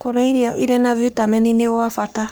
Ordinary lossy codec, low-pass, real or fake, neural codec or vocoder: none; none; fake; codec, 44.1 kHz, 3.4 kbps, Pupu-Codec